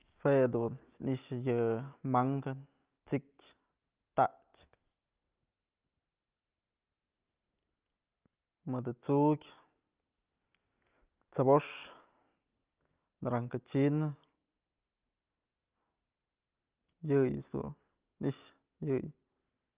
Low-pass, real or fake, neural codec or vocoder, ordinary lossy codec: 3.6 kHz; real; none; Opus, 24 kbps